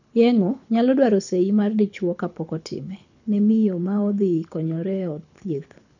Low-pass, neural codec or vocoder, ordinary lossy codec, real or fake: 7.2 kHz; codec, 24 kHz, 6 kbps, HILCodec; none; fake